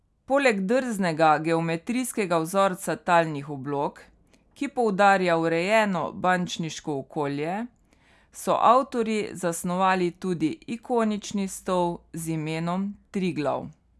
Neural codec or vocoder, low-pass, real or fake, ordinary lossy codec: none; none; real; none